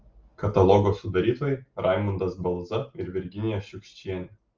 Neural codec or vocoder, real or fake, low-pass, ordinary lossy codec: none; real; 7.2 kHz; Opus, 24 kbps